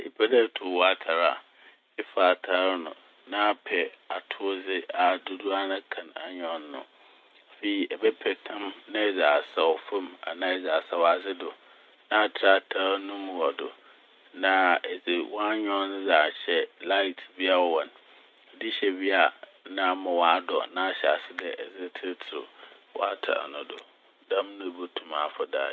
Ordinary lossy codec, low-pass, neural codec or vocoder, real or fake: none; none; none; real